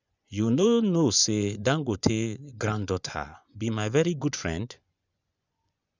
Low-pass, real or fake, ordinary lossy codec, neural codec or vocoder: 7.2 kHz; fake; none; vocoder, 24 kHz, 100 mel bands, Vocos